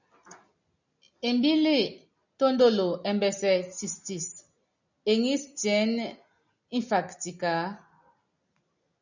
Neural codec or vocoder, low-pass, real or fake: none; 7.2 kHz; real